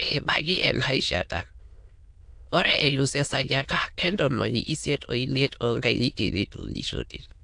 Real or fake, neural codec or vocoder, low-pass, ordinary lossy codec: fake; autoencoder, 22.05 kHz, a latent of 192 numbers a frame, VITS, trained on many speakers; 9.9 kHz; AAC, 64 kbps